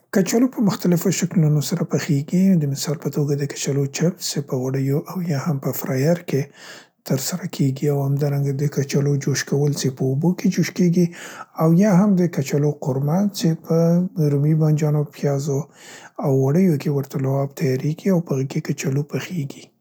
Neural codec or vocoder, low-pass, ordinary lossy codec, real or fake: none; none; none; real